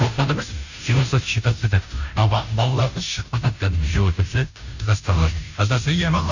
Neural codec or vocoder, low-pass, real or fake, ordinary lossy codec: codec, 16 kHz, 0.5 kbps, FunCodec, trained on Chinese and English, 25 frames a second; 7.2 kHz; fake; none